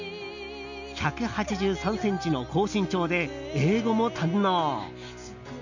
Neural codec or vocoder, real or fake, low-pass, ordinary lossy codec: none; real; 7.2 kHz; none